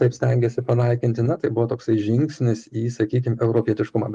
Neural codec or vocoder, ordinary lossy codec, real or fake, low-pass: none; Opus, 32 kbps; real; 10.8 kHz